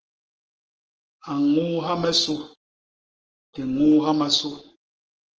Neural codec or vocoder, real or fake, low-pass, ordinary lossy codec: codec, 44.1 kHz, 7.8 kbps, Pupu-Codec; fake; 7.2 kHz; Opus, 16 kbps